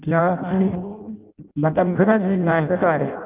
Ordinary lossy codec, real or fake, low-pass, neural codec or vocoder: Opus, 24 kbps; fake; 3.6 kHz; codec, 16 kHz in and 24 kHz out, 0.6 kbps, FireRedTTS-2 codec